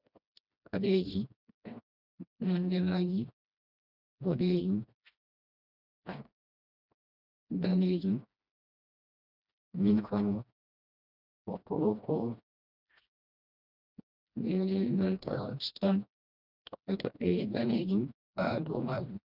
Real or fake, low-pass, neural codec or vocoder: fake; 5.4 kHz; codec, 16 kHz, 1 kbps, FreqCodec, smaller model